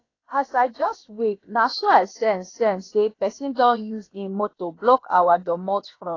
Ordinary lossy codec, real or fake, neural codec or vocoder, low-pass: AAC, 32 kbps; fake; codec, 16 kHz, about 1 kbps, DyCAST, with the encoder's durations; 7.2 kHz